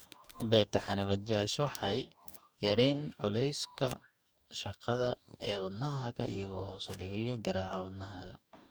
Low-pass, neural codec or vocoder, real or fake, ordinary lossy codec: none; codec, 44.1 kHz, 2.6 kbps, DAC; fake; none